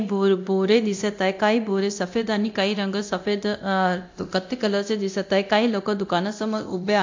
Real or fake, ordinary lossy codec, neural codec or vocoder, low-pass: fake; MP3, 48 kbps; codec, 24 kHz, 0.9 kbps, DualCodec; 7.2 kHz